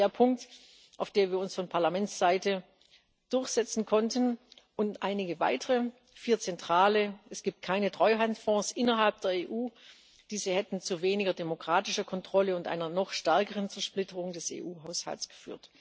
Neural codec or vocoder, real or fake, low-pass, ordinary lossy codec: none; real; none; none